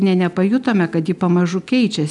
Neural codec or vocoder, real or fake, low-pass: none; real; 10.8 kHz